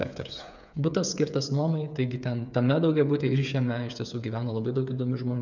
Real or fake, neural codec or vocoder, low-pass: fake; codec, 16 kHz, 8 kbps, FreqCodec, smaller model; 7.2 kHz